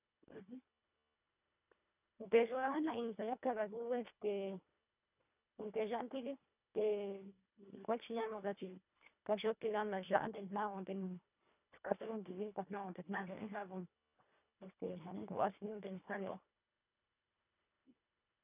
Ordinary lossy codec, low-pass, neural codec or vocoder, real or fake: none; 3.6 kHz; codec, 24 kHz, 1.5 kbps, HILCodec; fake